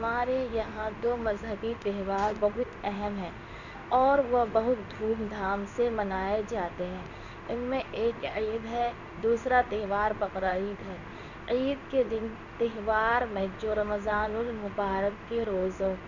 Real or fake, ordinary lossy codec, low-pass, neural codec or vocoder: fake; none; 7.2 kHz; codec, 16 kHz in and 24 kHz out, 1 kbps, XY-Tokenizer